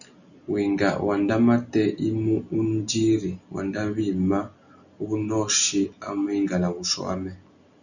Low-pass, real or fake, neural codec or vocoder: 7.2 kHz; real; none